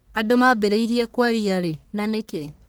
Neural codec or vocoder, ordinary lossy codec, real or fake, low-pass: codec, 44.1 kHz, 1.7 kbps, Pupu-Codec; none; fake; none